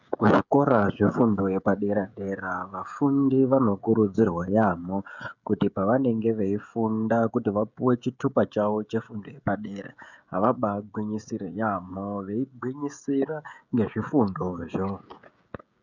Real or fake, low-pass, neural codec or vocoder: fake; 7.2 kHz; codec, 16 kHz, 16 kbps, FreqCodec, smaller model